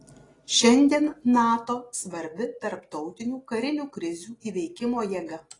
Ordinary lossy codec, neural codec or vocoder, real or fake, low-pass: AAC, 32 kbps; none; real; 10.8 kHz